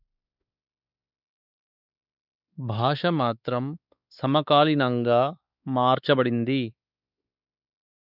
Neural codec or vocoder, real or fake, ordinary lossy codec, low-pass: codec, 16 kHz, 4 kbps, X-Codec, WavLM features, trained on Multilingual LibriSpeech; fake; none; 5.4 kHz